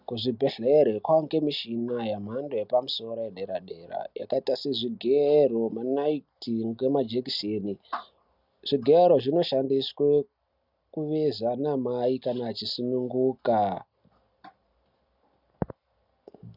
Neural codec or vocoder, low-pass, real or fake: none; 5.4 kHz; real